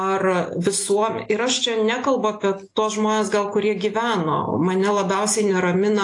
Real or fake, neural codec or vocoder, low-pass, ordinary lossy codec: real; none; 10.8 kHz; AAC, 48 kbps